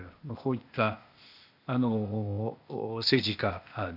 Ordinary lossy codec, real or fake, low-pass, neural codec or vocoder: none; fake; 5.4 kHz; codec, 16 kHz, 0.8 kbps, ZipCodec